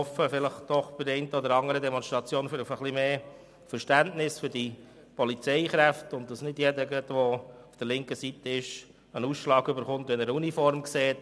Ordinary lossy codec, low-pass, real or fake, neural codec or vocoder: none; none; real; none